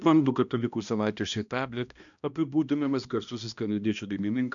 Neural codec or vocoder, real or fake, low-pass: codec, 16 kHz, 1 kbps, X-Codec, HuBERT features, trained on balanced general audio; fake; 7.2 kHz